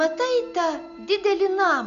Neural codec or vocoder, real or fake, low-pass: none; real; 7.2 kHz